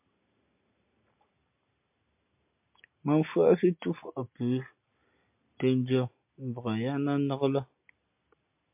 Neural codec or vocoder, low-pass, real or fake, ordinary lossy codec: none; 3.6 kHz; real; MP3, 32 kbps